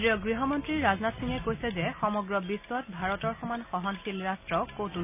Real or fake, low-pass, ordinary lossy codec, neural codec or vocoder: real; 3.6 kHz; none; none